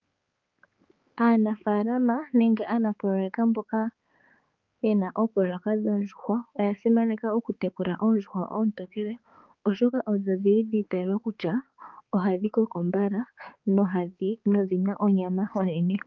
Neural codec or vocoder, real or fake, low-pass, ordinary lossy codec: codec, 16 kHz, 4 kbps, X-Codec, HuBERT features, trained on balanced general audio; fake; 7.2 kHz; Opus, 32 kbps